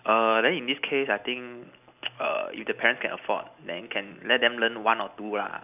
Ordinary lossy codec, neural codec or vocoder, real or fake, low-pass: none; none; real; 3.6 kHz